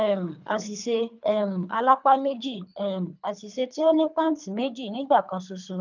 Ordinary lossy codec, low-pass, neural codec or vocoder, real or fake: none; 7.2 kHz; codec, 24 kHz, 3 kbps, HILCodec; fake